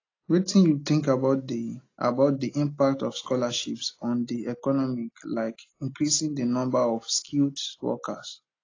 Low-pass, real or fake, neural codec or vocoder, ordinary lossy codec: 7.2 kHz; real; none; AAC, 32 kbps